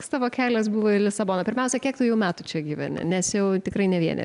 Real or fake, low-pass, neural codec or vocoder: real; 10.8 kHz; none